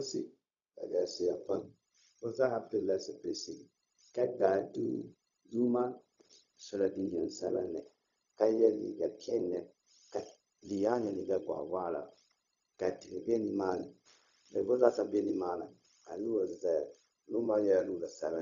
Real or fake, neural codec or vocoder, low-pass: fake; codec, 16 kHz, 0.4 kbps, LongCat-Audio-Codec; 7.2 kHz